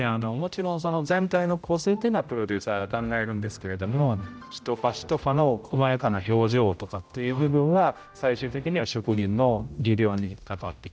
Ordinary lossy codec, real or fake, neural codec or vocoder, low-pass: none; fake; codec, 16 kHz, 0.5 kbps, X-Codec, HuBERT features, trained on general audio; none